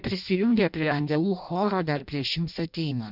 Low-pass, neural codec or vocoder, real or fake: 5.4 kHz; codec, 16 kHz in and 24 kHz out, 0.6 kbps, FireRedTTS-2 codec; fake